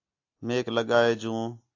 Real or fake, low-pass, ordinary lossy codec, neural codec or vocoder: real; 7.2 kHz; AAC, 48 kbps; none